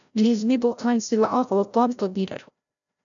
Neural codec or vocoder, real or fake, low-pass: codec, 16 kHz, 0.5 kbps, FreqCodec, larger model; fake; 7.2 kHz